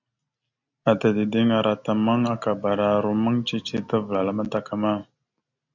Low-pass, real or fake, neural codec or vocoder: 7.2 kHz; real; none